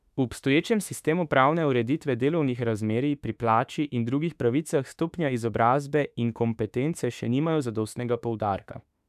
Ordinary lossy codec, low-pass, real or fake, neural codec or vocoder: none; 14.4 kHz; fake; autoencoder, 48 kHz, 32 numbers a frame, DAC-VAE, trained on Japanese speech